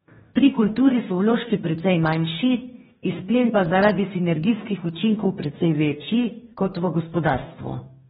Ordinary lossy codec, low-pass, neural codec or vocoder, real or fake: AAC, 16 kbps; 19.8 kHz; codec, 44.1 kHz, 2.6 kbps, DAC; fake